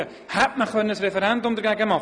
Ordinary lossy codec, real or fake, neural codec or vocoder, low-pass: none; real; none; 9.9 kHz